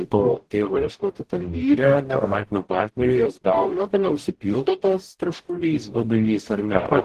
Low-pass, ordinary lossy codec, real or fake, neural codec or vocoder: 14.4 kHz; Opus, 16 kbps; fake; codec, 44.1 kHz, 0.9 kbps, DAC